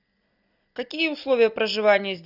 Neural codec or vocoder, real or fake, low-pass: none; real; 5.4 kHz